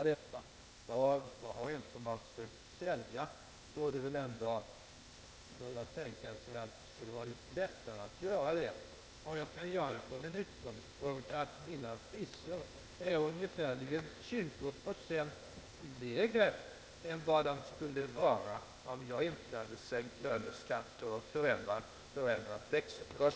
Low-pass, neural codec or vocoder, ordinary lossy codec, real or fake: none; codec, 16 kHz, 0.8 kbps, ZipCodec; none; fake